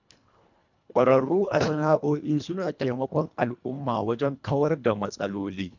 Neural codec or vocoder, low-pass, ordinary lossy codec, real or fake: codec, 24 kHz, 1.5 kbps, HILCodec; 7.2 kHz; none; fake